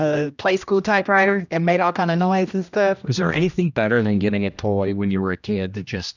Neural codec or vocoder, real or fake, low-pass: codec, 16 kHz, 1 kbps, X-Codec, HuBERT features, trained on general audio; fake; 7.2 kHz